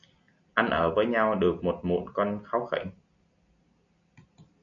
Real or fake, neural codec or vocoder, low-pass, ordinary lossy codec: real; none; 7.2 kHz; MP3, 96 kbps